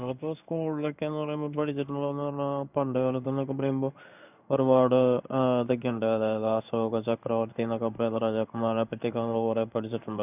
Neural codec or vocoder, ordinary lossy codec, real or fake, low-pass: codec, 16 kHz in and 24 kHz out, 1 kbps, XY-Tokenizer; none; fake; 3.6 kHz